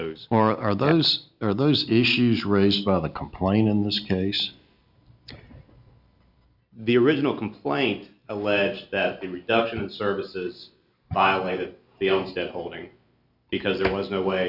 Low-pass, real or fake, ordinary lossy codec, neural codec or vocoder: 5.4 kHz; real; Opus, 64 kbps; none